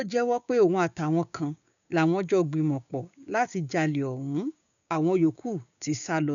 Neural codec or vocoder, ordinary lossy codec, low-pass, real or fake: codec, 16 kHz, 6 kbps, DAC; none; 7.2 kHz; fake